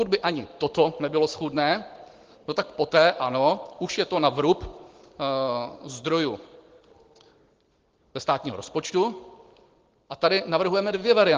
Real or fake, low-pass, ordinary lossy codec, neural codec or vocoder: real; 7.2 kHz; Opus, 16 kbps; none